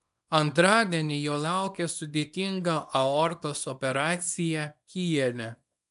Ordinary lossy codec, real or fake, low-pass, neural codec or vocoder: MP3, 96 kbps; fake; 10.8 kHz; codec, 24 kHz, 0.9 kbps, WavTokenizer, small release